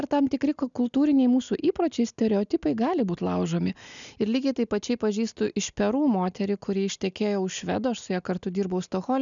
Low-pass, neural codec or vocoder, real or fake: 7.2 kHz; none; real